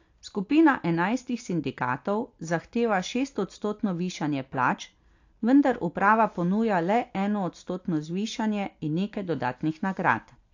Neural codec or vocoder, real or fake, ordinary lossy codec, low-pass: none; real; AAC, 48 kbps; 7.2 kHz